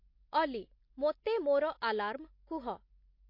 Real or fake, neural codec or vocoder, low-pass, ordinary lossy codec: real; none; 5.4 kHz; MP3, 32 kbps